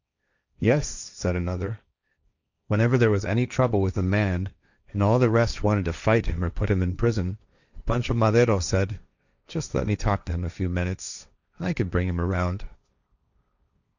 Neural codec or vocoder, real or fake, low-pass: codec, 16 kHz, 1.1 kbps, Voila-Tokenizer; fake; 7.2 kHz